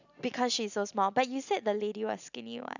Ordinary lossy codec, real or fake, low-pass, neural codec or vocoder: none; real; 7.2 kHz; none